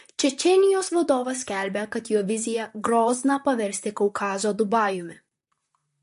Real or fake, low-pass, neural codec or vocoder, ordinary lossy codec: fake; 14.4 kHz; vocoder, 44.1 kHz, 128 mel bands, Pupu-Vocoder; MP3, 48 kbps